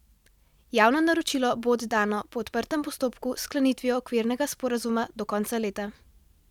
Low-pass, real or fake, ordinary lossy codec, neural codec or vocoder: 19.8 kHz; fake; none; vocoder, 44.1 kHz, 128 mel bands every 512 samples, BigVGAN v2